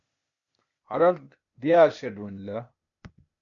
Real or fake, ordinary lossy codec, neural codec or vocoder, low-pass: fake; MP3, 48 kbps; codec, 16 kHz, 0.8 kbps, ZipCodec; 7.2 kHz